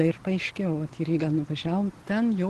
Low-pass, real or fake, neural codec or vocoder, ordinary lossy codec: 10.8 kHz; real; none; Opus, 16 kbps